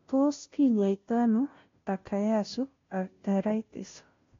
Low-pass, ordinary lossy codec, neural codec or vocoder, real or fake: 7.2 kHz; AAC, 32 kbps; codec, 16 kHz, 0.5 kbps, FunCodec, trained on Chinese and English, 25 frames a second; fake